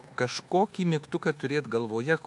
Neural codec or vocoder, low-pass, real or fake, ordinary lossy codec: codec, 24 kHz, 1.2 kbps, DualCodec; 10.8 kHz; fake; AAC, 64 kbps